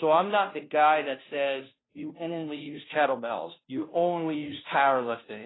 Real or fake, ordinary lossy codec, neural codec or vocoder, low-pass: fake; AAC, 16 kbps; codec, 16 kHz, 0.5 kbps, FunCodec, trained on Chinese and English, 25 frames a second; 7.2 kHz